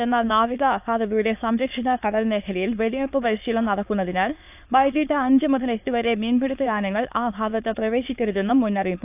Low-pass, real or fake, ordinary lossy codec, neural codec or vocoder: 3.6 kHz; fake; AAC, 32 kbps; autoencoder, 22.05 kHz, a latent of 192 numbers a frame, VITS, trained on many speakers